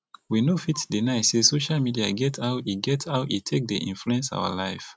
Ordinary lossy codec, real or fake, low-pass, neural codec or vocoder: none; real; none; none